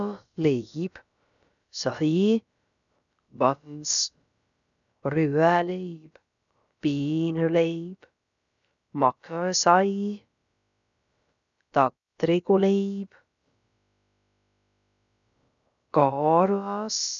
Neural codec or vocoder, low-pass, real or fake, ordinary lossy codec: codec, 16 kHz, about 1 kbps, DyCAST, with the encoder's durations; 7.2 kHz; fake; none